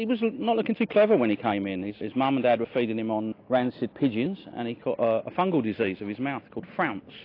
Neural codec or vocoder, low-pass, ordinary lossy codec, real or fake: none; 5.4 kHz; AAC, 32 kbps; real